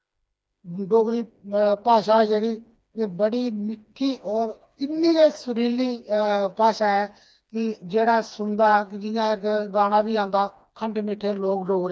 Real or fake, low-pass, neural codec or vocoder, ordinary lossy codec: fake; none; codec, 16 kHz, 2 kbps, FreqCodec, smaller model; none